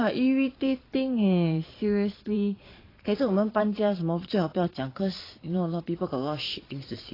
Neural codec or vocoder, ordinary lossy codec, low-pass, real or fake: vocoder, 22.05 kHz, 80 mel bands, Vocos; AAC, 24 kbps; 5.4 kHz; fake